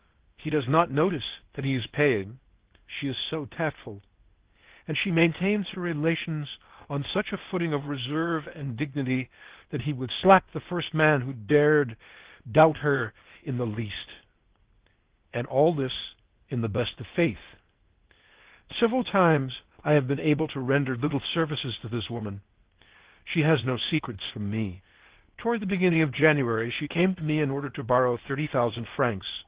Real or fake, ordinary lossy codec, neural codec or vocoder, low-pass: fake; Opus, 16 kbps; codec, 16 kHz, 0.8 kbps, ZipCodec; 3.6 kHz